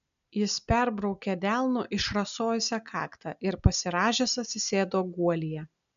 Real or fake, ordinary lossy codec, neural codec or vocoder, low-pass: real; MP3, 96 kbps; none; 7.2 kHz